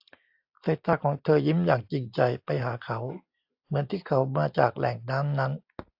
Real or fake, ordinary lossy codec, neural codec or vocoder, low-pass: real; AAC, 48 kbps; none; 5.4 kHz